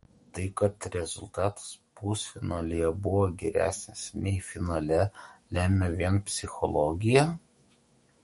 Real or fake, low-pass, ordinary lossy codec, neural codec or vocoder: fake; 19.8 kHz; MP3, 48 kbps; autoencoder, 48 kHz, 128 numbers a frame, DAC-VAE, trained on Japanese speech